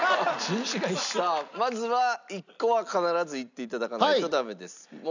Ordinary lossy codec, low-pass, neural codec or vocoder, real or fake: none; 7.2 kHz; none; real